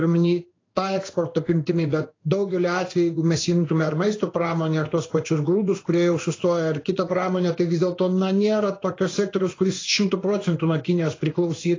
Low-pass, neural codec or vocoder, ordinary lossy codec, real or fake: 7.2 kHz; codec, 16 kHz in and 24 kHz out, 1 kbps, XY-Tokenizer; AAC, 32 kbps; fake